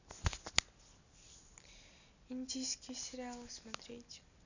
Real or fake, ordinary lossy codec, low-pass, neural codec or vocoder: real; none; 7.2 kHz; none